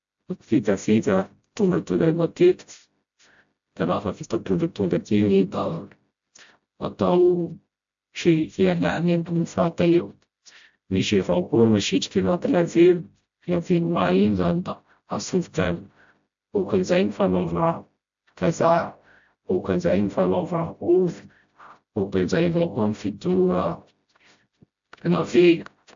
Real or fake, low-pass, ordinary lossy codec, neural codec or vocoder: fake; 7.2 kHz; AAC, 64 kbps; codec, 16 kHz, 0.5 kbps, FreqCodec, smaller model